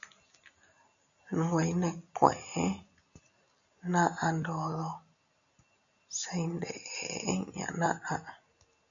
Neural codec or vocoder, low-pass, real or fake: none; 7.2 kHz; real